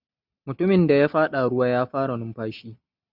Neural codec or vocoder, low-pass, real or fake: none; 5.4 kHz; real